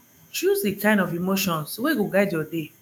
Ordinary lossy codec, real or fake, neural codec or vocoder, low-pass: none; fake; autoencoder, 48 kHz, 128 numbers a frame, DAC-VAE, trained on Japanese speech; none